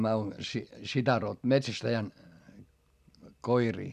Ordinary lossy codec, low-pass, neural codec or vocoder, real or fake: none; 14.4 kHz; none; real